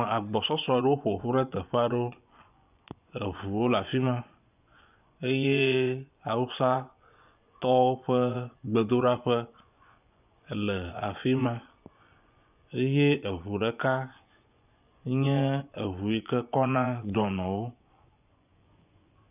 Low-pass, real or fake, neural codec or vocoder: 3.6 kHz; fake; vocoder, 24 kHz, 100 mel bands, Vocos